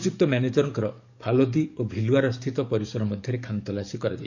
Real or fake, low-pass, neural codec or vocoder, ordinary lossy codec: fake; 7.2 kHz; codec, 44.1 kHz, 7.8 kbps, DAC; none